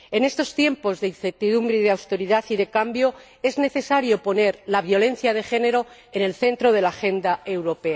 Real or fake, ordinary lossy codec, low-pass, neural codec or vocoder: real; none; none; none